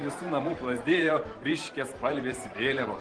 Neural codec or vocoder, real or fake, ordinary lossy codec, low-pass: vocoder, 44.1 kHz, 128 mel bands every 512 samples, BigVGAN v2; fake; Opus, 16 kbps; 9.9 kHz